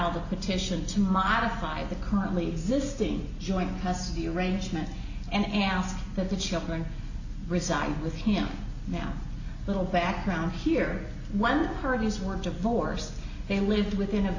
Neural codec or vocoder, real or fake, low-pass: none; real; 7.2 kHz